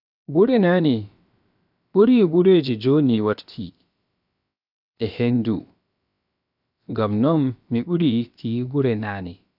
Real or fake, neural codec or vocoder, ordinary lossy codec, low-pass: fake; codec, 16 kHz, about 1 kbps, DyCAST, with the encoder's durations; none; 5.4 kHz